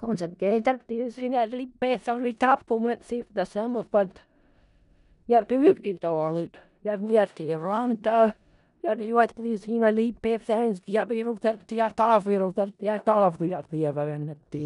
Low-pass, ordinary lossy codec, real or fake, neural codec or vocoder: 10.8 kHz; none; fake; codec, 16 kHz in and 24 kHz out, 0.4 kbps, LongCat-Audio-Codec, four codebook decoder